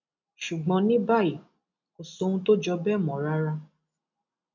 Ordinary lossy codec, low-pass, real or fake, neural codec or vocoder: none; 7.2 kHz; real; none